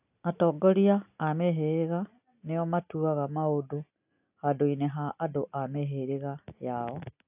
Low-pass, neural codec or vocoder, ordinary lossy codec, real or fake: 3.6 kHz; none; AAC, 32 kbps; real